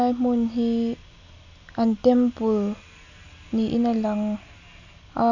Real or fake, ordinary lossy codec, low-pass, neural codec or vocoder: real; none; 7.2 kHz; none